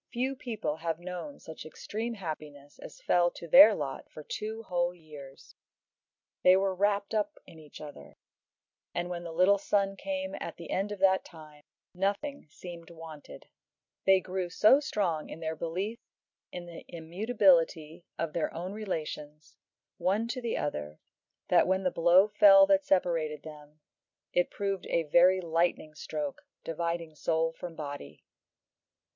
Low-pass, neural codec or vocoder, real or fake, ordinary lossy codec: 7.2 kHz; none; real; MP3, 48 kbps